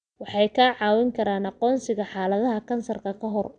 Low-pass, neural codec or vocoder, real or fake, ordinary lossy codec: 9.9 kHz; none; real; none